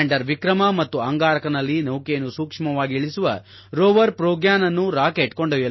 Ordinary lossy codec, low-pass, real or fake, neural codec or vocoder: MP3, 24 kbps; 7.2 kHz; real; none